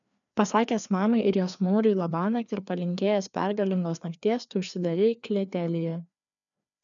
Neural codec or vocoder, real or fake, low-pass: codec, 16 kHz, 2 kbps, FreqCodec, larger model; fake; 7.2 kHz